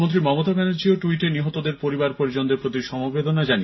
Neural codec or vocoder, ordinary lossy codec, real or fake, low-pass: none; MP3, 24 kbps; real; 7.2 kHz